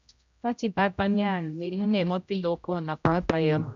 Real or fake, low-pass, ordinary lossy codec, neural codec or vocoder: fake; 7.2 kHz; MP3, 64 kbps; codec, 16 kHz, 0.5 kbps, X-Codec, HuBERT features, trained on general audio